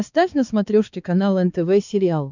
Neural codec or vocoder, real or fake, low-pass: codec, 16 kHz, 4 kbps, X-Codec, HuBERT features, trained on balanced general audio; fake; 7.2 kHz